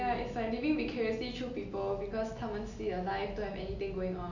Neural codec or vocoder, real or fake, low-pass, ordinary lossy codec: none; real; 7.2 kHz; none